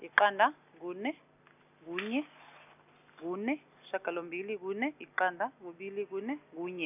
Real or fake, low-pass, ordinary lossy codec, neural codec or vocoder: real; 3.6 kHz; none; none